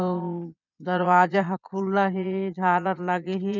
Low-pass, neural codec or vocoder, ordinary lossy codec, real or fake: 7.2 kHz; vocoder, 22.05 kHz, 80 mel bands, Vocos; none; fake